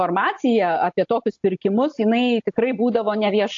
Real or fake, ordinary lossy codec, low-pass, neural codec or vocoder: real; AAC, 48 kbps; 7.2 kHz; none